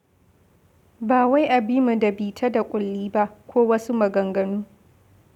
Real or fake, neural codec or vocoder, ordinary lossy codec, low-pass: real; none; none; 19.8 kHz